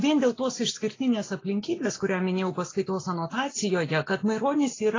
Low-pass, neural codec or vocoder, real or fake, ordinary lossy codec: 7.2 kHz; none; real; AAC, 32 kbps